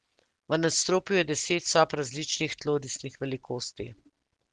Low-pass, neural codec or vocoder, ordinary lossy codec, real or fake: 10.8 kHz; none; Opus, 16 kbps; real